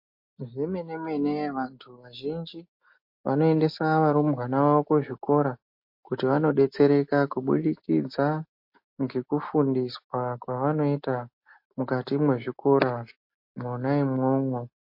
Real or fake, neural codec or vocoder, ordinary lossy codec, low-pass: real; none; MP3, 32 kbps; 5.4 kHz